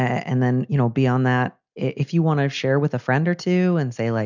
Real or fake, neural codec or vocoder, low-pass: real; none; 7.2 kHz